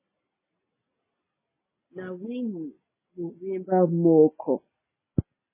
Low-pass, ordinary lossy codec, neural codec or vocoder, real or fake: 3.6 kHz; MP3, 16 kbps; none; real